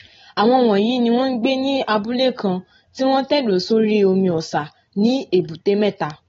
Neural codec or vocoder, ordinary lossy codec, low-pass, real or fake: none; AAC, 24 kbps; 7.2 kHz; real